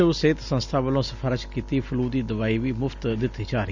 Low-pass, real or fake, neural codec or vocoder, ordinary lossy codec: 7.2 kHz; real; none; Opus, 64 kbps